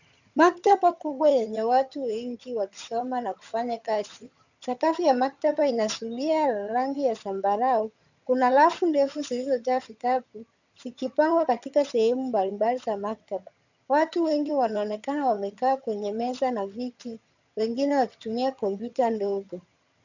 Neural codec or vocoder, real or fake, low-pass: vocoder, 22.05 kHz, 80 mel bands, HiFi-GAN; fake; 7.2 kHz